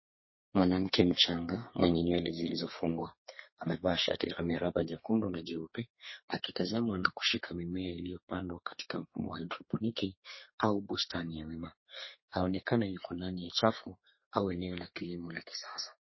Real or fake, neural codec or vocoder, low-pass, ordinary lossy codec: fake; codec, 32 kHz, 1.9 kbps, SNAC; 7.2 kHz; MP3, 24 kbps